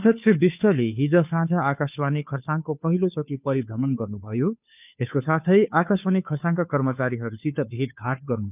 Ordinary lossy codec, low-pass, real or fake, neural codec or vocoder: none; 3.6 kHz; fake; codec, 16 kHz, 2 kbps, FunCodec, trained on Chinese and English, 25 frames a second